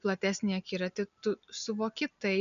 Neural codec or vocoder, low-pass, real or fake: none; 7.2 kHz; real